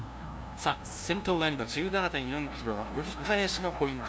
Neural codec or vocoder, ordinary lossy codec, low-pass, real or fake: codec, 16 kHz, 0.5 kbps, FunCodec, trained on LibriTTS, 25 frames a second; none; none; fake